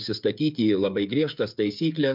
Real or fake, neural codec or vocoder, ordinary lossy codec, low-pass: fake; codec, 16 kHz, 16 kbps, FreqCodec, smaller model; MP3, 48 kbps; 5.4 kHz